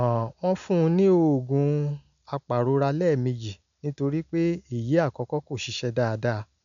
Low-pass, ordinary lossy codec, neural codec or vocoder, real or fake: 7.2 kHz; none; none; real